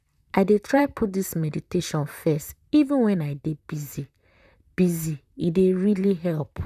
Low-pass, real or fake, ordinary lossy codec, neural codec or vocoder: 14.4 kHz; fake; none; vocoder, 44.1 kHz, 128 mel bands, Pupu-Vocoder